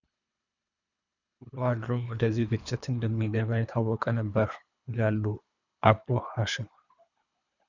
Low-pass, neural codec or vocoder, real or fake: 7.2 kHz; codec, 24 kHz, 3 kbps, HILCodec; fake